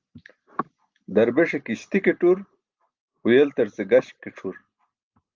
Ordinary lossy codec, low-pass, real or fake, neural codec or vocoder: Opus, 24 kbps; 7.2 kHz; real; none